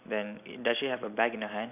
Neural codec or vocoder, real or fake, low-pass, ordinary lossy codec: none; real; 3.6 kHz; none